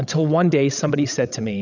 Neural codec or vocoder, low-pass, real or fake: codec, 16 kHz, 16 kbps, FreqCodec, larger model; 7.2 kHz; fake